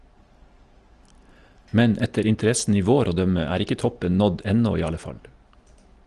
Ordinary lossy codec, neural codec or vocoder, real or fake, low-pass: Opus, 24 kbps; none; real; 10.8 kHz